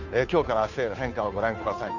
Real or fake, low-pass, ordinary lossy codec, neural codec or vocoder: fake; 7.2 kHz; none; codec, 16 kHz, 2 kbps, FunCodec, trained on Chinese and English, 25 frames a second